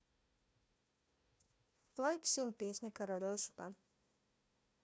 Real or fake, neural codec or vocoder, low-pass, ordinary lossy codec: fake; codec, 16 kHz, 1 kbps, FunCodec, trained on Chinese and English, 50 frames a second; none; none